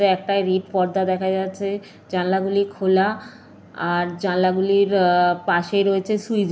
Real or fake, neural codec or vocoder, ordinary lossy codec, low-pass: real; none; none; none